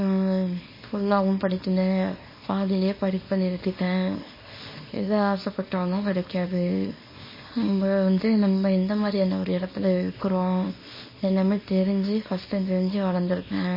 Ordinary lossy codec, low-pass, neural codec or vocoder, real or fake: MP3, 24 kbps; 5.4 kHz; codec, 24 kHz, 0.9 kbps, WavTokenizer, small release; fake